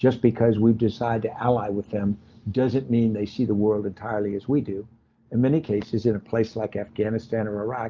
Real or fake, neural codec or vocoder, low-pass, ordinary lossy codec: real; none; 7.2 kHz; Opus, 24 kbps